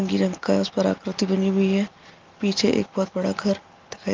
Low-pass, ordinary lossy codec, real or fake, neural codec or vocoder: 7.2 kHz; Opus, 32 kbps; real; none